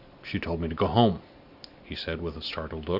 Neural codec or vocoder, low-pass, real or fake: none; 5.4 kHz; real